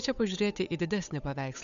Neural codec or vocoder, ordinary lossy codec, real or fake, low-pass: codec, 16 kHz, 8 kbps, FunCodec, trained on Chinese and English, 25 frames a second; MP3, 96 kbps; fake; 7.2 kHz